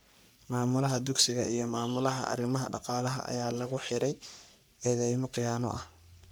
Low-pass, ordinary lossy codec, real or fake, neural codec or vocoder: none; none; fake; codec, 44.1 kHz, 3.4 kbps, Pupu-Codec